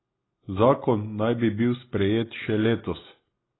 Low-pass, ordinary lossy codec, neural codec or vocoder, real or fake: 7.2 kHz; AAC, 16 kbps; none; real